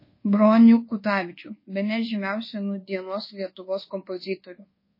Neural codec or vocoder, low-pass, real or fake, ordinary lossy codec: codec, 24 kHz, 1.2 kbps, DualCodec; 5.4 kHz; fake; MP3, 24 kbps